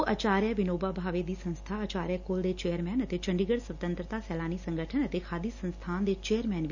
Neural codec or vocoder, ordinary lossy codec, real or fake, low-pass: none; none; real; 7.2 kHz